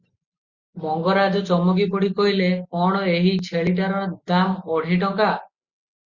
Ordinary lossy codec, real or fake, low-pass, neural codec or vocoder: Opus, 64 kbps; real; 7.2 kHz; none